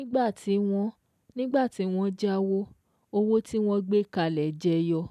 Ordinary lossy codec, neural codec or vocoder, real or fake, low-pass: none; none; real; 14.4 kHz